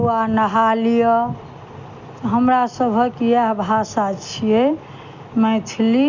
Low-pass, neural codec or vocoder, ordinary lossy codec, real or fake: 7.2 kHz; none; none; real